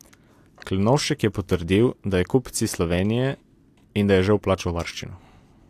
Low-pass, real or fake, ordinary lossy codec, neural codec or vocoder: 14.4 kHz; real; AAC, 64 kbps; none